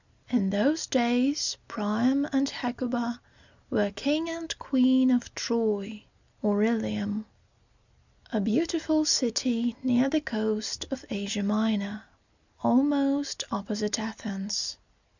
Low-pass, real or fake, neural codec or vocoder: 7.2 kHz; real; none